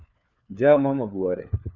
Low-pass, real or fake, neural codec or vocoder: 7.2 kHz; fake; codec, 16 kHz, 4 kbps, FunCodec, trained on LibriTTS, 50 frames a second